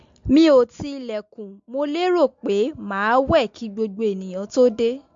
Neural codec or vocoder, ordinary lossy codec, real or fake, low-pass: none; MP3, 48 kbps; real; 7.2 kHz